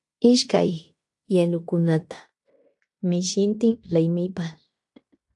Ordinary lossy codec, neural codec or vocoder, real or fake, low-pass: AAC, 64 kbps; codec, 16 kHz in and 24 kHz out, 0.9 kbps, LongCat-Audio-Codec, fine tuned four codebook decoder; fake; 10.8 kHz